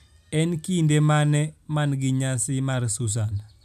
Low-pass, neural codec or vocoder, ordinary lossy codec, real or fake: 14.4 kHz; none; none; real